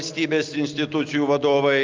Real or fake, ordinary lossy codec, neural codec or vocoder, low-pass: real; Opus, 24 kbps; none; 7.2 kHz